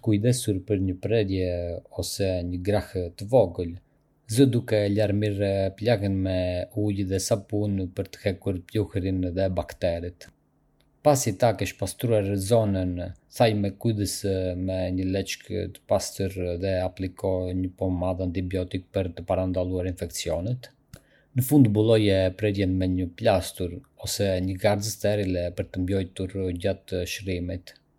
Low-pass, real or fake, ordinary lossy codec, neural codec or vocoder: 19.8 kHz; real; MP3, 96 kbps; none